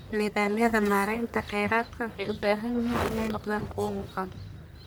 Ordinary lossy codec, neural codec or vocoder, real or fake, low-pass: none; codec, 44.1 kHz, 1.7 kbps, Pupu-Codec; fake; none